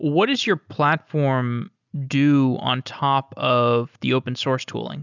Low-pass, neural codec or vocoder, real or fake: 7.2 kHz; none; real